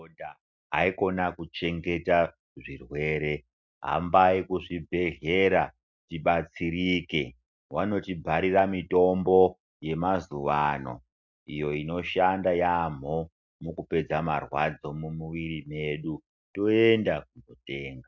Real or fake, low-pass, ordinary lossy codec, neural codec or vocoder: real; 7.2 kHz; MP3, 64 kbps; none